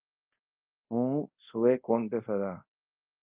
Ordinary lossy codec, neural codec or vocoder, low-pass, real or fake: Opus, 24 kbps; codec, 24 kHz, 0.5 kbps, DualCodec; 3.6 kHz; fake